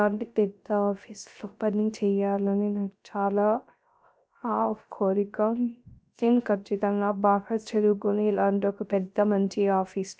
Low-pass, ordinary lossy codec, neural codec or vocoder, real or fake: none; none; codec, 16 kHz, 0.3 kbps, FocalCodec; fake